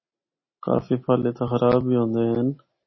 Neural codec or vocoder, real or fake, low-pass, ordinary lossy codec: none; real; 7.2 kHz; MP3, 24 kbps